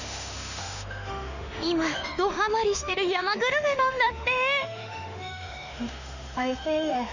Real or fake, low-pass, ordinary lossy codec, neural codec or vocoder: fake; 7.2 kHz; none; autoencoder, 48 kHz, 32 numbers a frame, DAC-VAE, trained on Japanese speech